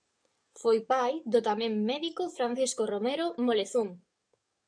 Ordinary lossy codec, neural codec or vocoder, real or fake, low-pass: MP3, 96 kbps; codec, 44.1 kHz, 7.8 kbps, DAC; fake; 9.9 kHz